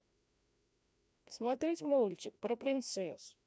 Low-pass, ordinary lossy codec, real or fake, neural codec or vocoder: none; none; fake; codec, 16 kHz, 1 kbps, FreqCodec, larger model